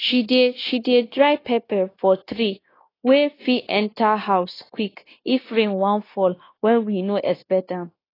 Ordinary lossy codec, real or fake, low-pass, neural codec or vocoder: AAC, 24 kbps; fake; 5.4 kHz; codec, 16 kHz, 0.9 kbps, LongCat-Audio-Codec